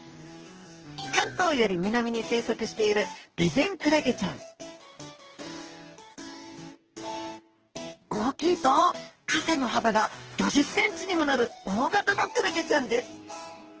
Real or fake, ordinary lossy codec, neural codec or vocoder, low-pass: fake; Opus, 16 kbps; codec, 44.1 kHz, 2.6 kbps, DAC; 7.2 kHz